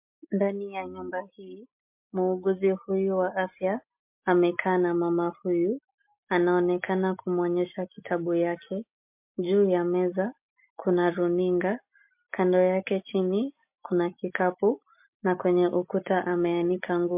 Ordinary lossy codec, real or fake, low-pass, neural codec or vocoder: MP3, 32 kbps; real; 3.6 kHz; none